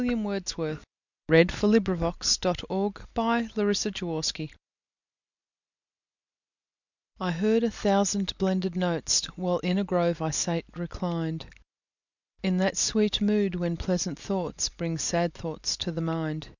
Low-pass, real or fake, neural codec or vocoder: 7.2 kHz; real; none